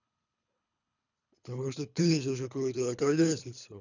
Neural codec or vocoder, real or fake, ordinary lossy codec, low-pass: codec, 24 kHz, 3 kbps, HILCodec; fake; none; 7.2 kHz